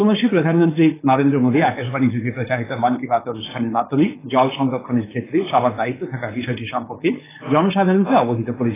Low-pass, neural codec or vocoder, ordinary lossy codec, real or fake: 3.6 kHz; codec, 16 kHz, 4.8 kbps, FACodec; AAC, 16 kbps; fake